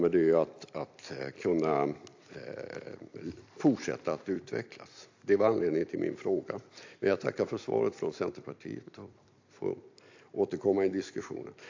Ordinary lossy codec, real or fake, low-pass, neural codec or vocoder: AAC, 48 kbps; fake; 7.2 kHz; vocoder, 44.1 kHz, 128 mel bands every 256 samples, BigVGAN v2